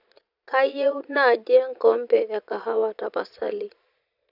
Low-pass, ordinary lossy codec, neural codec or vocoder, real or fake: 5.4 kHz; none; vocoder, 22.05 kHz, 80 mel bands, Vocos; fake